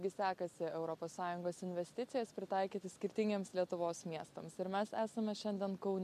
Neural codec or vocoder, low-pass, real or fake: none; 14.4 kHz; real